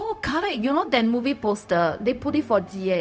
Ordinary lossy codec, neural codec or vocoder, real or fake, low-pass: none; codec, 16 kHz, 0.4 kbps, LongCat-Audio-Codec; fake; none